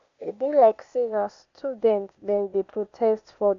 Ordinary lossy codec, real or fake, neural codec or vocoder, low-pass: none; fake; codec, 16 kHz, 0.8 kbps, ZipCodec; 7.2 kHz